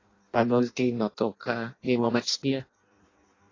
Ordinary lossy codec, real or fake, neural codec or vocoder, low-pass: AAC, 32 kbps; fake; codec, 16 kHz in and 24 kHz out, 0.6 kbps, FireRedTTS-2 codec; 7.2 kHz